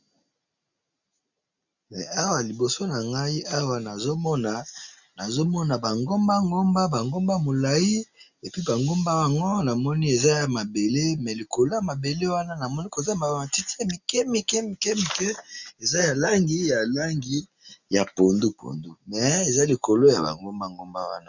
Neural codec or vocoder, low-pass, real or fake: none; 7.2 kHz; real